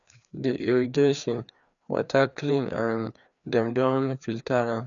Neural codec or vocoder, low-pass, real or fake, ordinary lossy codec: codec, 16 kHz, 2 kbps, FreqCodec, larger model; 7.2 kHz; fake; none